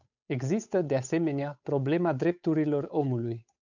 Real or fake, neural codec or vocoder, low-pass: fake; codec, 16 kHz, 8 kbps, FunCodec, trained on Chinese and English, 25 frames a second; 7.2 kHz